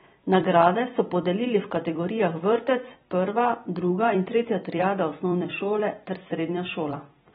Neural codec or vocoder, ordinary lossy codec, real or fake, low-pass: vocoder, 44.1 kHz, 128 mel bands every 256 samples, BigVGAN v2; AAC, 16 kbps; fake; 19.8 kHz